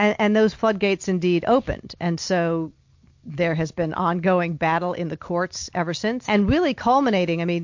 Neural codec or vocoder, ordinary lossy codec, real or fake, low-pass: none; MP3, 48 kbps; real; 7.2 kHz